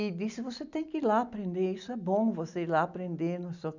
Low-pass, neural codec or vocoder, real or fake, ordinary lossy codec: 7.2 kHz; none; real; none